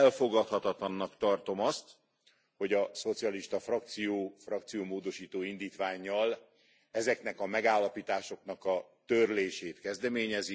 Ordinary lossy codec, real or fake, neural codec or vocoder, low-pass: none; real; none; none